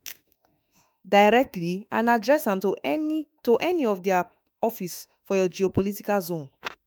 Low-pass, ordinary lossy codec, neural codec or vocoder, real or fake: none; none; autoencoder, 48 kHz, 32 numbers a frame, DAC-VAE, trained on Japanese speech; fake